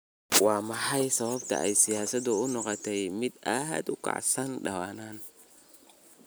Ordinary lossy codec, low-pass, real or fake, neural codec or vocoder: none; none; real; none